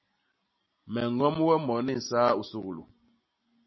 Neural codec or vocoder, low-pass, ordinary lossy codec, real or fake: none; 7.2 kHz; MP3, 24 kbps; real